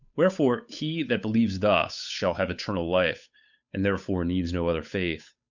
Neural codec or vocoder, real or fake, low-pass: codec, 16 kHz, 8 kbps, FunCodec, trained on Chinese and English, 25 frames a second; fake; 7.2 kHz